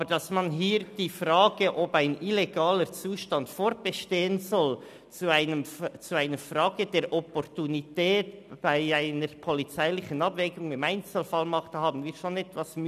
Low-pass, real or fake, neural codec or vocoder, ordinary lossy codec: 14.4 kHz; real; none; none